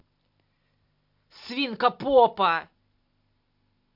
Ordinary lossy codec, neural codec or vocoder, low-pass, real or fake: MP3, 48 kbps; none; 5.4 kHz; real